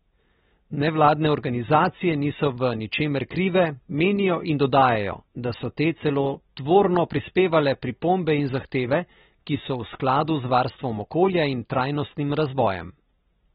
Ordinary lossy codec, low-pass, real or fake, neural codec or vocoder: AAC, 16 kbps; 7.2 kHz; real; none